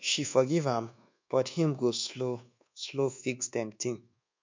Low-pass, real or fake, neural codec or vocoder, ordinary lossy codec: 7.2 kHz; fake; codec, 24 kHz, 1.2 kbps, DualCodec; MP3, 64 kbps